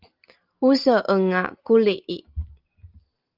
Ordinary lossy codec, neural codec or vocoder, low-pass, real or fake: Opus, 32 kbps; none; 5.4 kHz; real